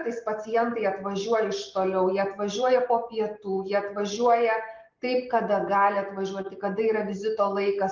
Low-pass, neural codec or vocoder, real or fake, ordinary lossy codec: 7.2 kHz; none; real; Opus, 32 kbps